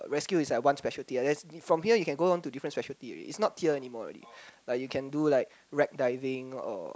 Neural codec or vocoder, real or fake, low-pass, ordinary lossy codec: none; real; none; none